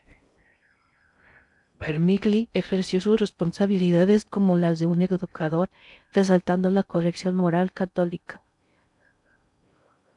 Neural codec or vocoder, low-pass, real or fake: codec, 16 kHz in and 24 kHz out, 0.6 kbps, FocalCodec, streaming, 2048 codes; 10.8 kHz; fake